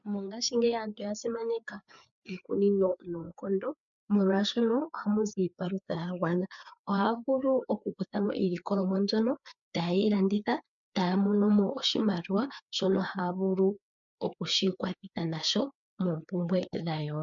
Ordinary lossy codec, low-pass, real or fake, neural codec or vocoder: MP3, 64 kbps; 7.2 kHz; fake; codec, 16 kHz, 4 kbps, FreqCodec, larger model